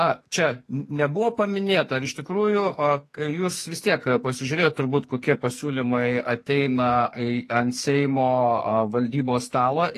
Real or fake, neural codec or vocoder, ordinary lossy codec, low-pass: fake; codec, 44.1 kHz, 2.6 kbps, SNAC; AAC, 48 kbps; 14.4 kHz